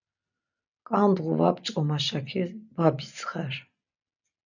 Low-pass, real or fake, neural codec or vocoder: 7.2 kHz; real; none